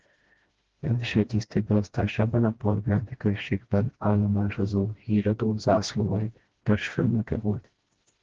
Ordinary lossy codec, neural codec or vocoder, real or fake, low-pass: Opus, 16 kbps; codec, 16 kHz, 1 kbps, FreqCodec, smaller model; fake; 7.2 kHz